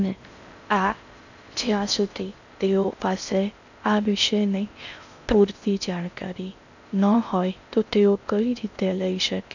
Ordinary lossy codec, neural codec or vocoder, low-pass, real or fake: none; codec, 16 kHz in and 24 kHz out, 0.6 kbps, FocalCodec, streaming, 4096 codes; 7.2 kHz; fake